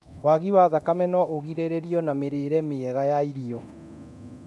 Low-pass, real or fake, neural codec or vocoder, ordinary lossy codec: none; fake; codec, 24 kHz, 0.9 kbps, DualCodec; none